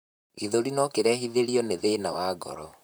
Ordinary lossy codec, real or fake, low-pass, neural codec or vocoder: none; fake; none; vocoder, 44.1 kHz, 128 mel bands, Pupu-Vocoder